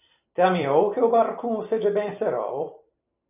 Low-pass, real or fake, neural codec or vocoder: 3.6 kHz; fake; vocoder, 44.1 kHz, 128 mel bands every 256 samples, BigVGAN v2